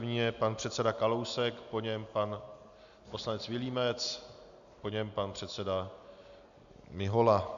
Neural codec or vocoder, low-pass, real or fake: none; 7.2 kHz; real